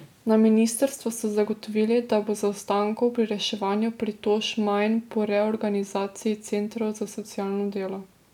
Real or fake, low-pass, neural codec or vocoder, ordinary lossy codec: real; 19.8 kHz; none; none